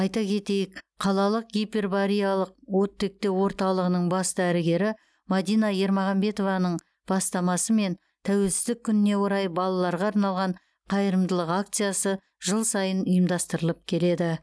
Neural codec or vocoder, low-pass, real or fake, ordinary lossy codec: none; none; real; none